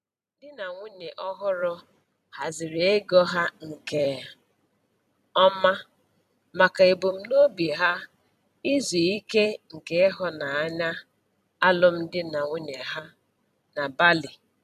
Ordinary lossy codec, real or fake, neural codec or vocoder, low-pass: none; fake; vocoder, 44.1 kHz, 128 mel bands every 256 samples, BigVGAN v2; 14.4 kHz